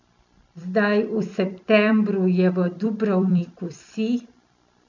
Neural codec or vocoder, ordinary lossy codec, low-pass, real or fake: vocoder, 44.1 kHz, 128 mel bands every 256 samples, BigVGAN v2; none; 7.2 kHz; fake